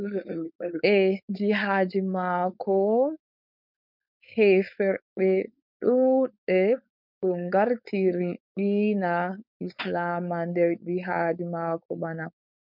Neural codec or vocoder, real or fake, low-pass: codec, 16 kHz, 4.8 kbps, FACodec; fake; 5.4 kHz